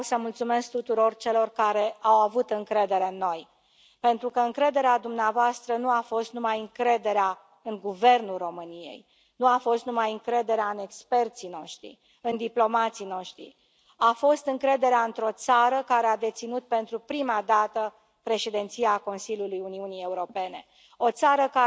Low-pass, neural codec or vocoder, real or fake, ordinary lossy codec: none; none; real; none